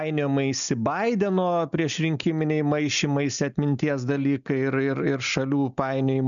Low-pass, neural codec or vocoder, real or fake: 7.2 kHz; none; real